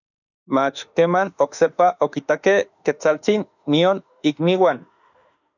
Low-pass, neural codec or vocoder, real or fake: 7.2 kHz; autoencoder, 48 kHz, 32 numbers a frame, DAC-VAE, trained on Japanese speech; fake